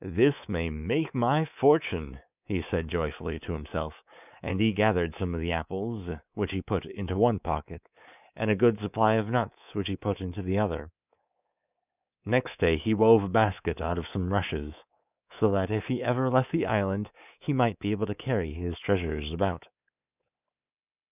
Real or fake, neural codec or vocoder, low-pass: fake; codec, 24 kHz, 3.1 kbps, DualCodec; 3.6 kHz